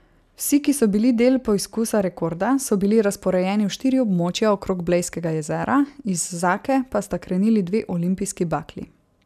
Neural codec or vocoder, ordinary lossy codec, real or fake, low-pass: none; none; real; 14.4 kHz